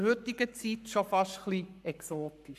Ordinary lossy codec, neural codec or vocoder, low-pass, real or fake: none; codec, 44.1 kHz, 7.8 kbps, Pupu-Codec; 14.4 kHz; fake